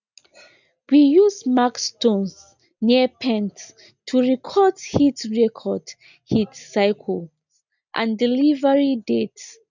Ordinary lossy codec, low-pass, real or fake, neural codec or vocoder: none; 7.2 kHz; real; none